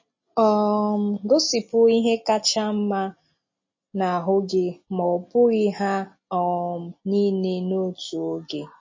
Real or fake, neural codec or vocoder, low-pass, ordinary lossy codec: real; none; 7.2 kHz; MP3, 32 kbps